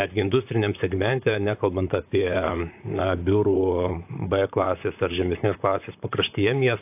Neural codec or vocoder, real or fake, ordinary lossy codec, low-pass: vocoder, 44.1 kHz, 128 mel bands, Pupu-Vocoder; fake; AAC, 32 kbps; 3.6 kHz